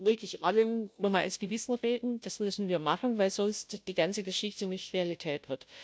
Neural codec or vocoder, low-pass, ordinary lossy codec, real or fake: codec, 16 kHz, 0.5 kbps, FunCodec, trained on Chinese and English, 25 frames a second; none; none; fake